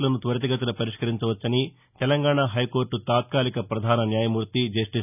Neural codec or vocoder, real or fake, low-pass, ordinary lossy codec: none; real; 3.6 kHz; none